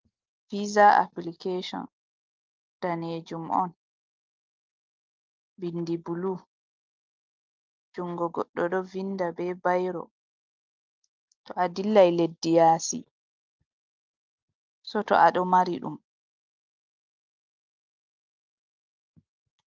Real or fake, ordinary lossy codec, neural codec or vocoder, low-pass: real; Opus, 24 kbps; none; 7.2 kHz